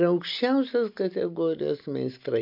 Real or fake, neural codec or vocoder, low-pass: real; none; 5.4 kHz